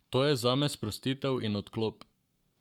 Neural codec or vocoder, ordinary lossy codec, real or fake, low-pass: codec, 44.1 kHz, 7.8 kbps, Pupu-Codec; none; fake; 19.8 kHz